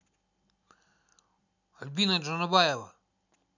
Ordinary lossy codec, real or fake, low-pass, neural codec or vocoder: none; real; 7.2 kHz; none